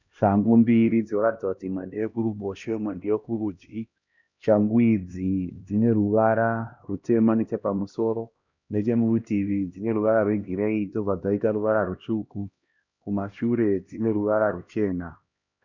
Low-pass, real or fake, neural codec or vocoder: 7.2 kHz; fake; codec, 16 kHz, 1 kbps, X-Codec, HuBERT features, trained on LibriSpeech